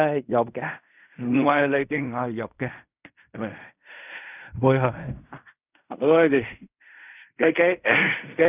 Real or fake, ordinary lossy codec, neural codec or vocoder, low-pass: fake; none; codec, 16 kHz in and 24 kHz out, 0.4 kbps, LongCat-Audio-Codec, fine tuned four codebook decoder; 3.6 kHz